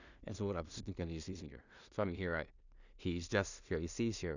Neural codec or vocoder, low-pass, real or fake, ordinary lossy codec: codec, 16 kHz in and 24 kHz out, 0.4 kbps, LongCat-Audio-Codec, two codebook decoder; 7.2 kHz; fake; none